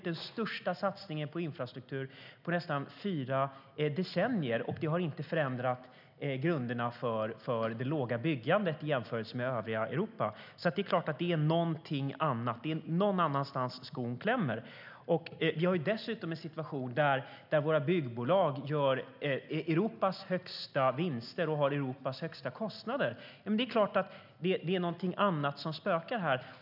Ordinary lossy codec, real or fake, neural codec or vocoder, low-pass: none; real; none; 5.4 kHz